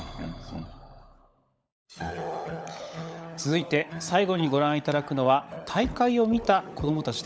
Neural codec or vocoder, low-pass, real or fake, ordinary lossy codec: codec, 16 kHz, 16 kbps, FunCodec, trained on LibriTTS, 50 frames a second; none; fake; none